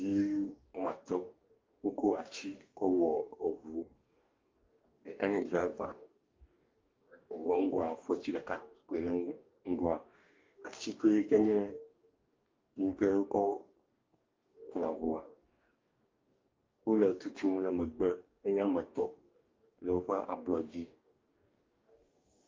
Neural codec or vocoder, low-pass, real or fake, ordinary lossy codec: codec, 44.1 kHz, 2.6 kbps, DAC; 7.2 kHz; fake; Opus, 24 kbps